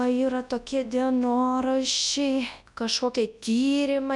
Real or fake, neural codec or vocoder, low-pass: fake; codec, 24 kHz, 0.9 kbps, WavTokenizer, large speech release; 10.8 kHz